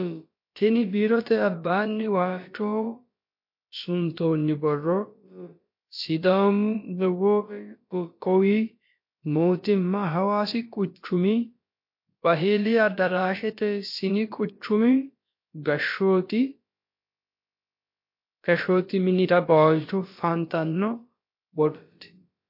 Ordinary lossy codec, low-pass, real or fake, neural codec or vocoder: MP3, 32 kbps; 5.4 kHz; fake; codec, 16 kHz, about 1 kbps, DyCAST, with the encoder's durations